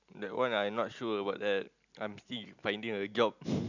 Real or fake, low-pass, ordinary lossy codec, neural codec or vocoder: real; 7.2 kHz; none; none